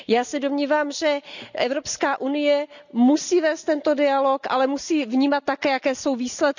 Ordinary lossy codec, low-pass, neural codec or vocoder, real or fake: none; 7.2 kHz; none; real